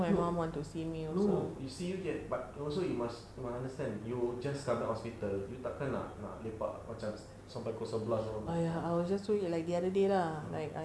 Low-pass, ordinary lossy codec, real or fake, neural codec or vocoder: none; none; real; none